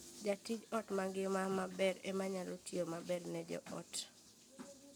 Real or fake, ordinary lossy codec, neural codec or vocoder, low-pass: real; none; none; none